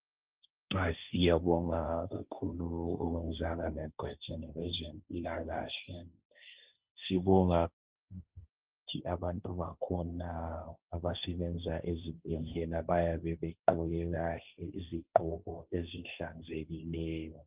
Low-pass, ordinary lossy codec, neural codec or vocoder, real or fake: 3.6 kHz; Opus, 32 kbps; codec, 16 kHz, 1.1 kbps, Voila-Tokenizer; fake